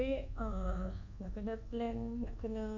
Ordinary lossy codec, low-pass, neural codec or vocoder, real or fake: none; 7.2 kHz; codec, 24 kHz, 1.2 kbps, DualCodec; fake